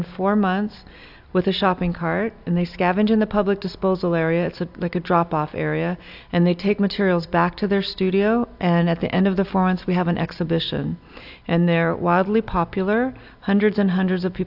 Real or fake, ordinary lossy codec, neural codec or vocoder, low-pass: real; AAC, 48 kbps; none; 5.4 kHz